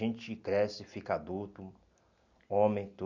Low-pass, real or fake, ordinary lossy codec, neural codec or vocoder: 7.2 kHz; real; none; none